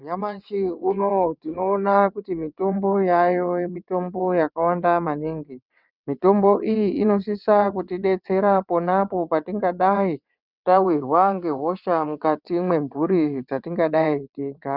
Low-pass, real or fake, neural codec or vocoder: 5.4 kHz; fake; vocoder, 22.05 kHz, 80 mel bands, WaveNeXt